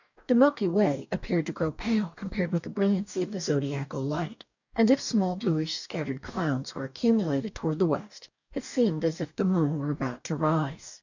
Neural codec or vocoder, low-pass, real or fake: codec, 44.1 kHz, 2.6 kbps, DAC; 7.2 kHz; fake